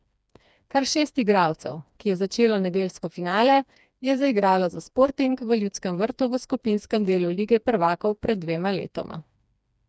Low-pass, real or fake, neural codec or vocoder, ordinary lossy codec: none; fake; codec, 16 kHz, 2 kbps, FreqCodec, smaller model; none